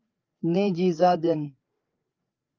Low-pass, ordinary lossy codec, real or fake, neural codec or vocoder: 7.2 kHz; Opus, 32 kbps; fake; codec, 16 kHz, 4 kbps, FreqCodec, larger model